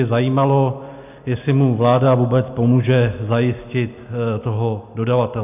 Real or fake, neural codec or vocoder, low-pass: real; none; 3.6 kHz